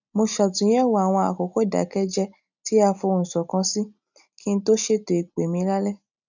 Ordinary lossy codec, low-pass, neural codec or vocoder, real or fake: none; 7.2 kHz; none; real